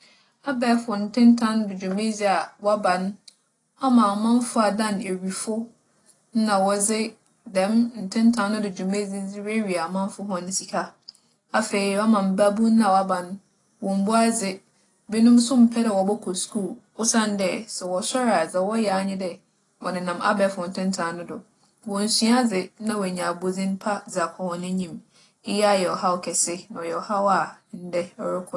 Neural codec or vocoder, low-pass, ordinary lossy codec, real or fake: none; 10.8 kHz; AAC, 32 kbps; real